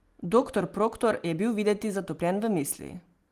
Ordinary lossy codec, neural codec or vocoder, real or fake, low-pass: Opus, 24 kbps; none; real; 14.4 kHz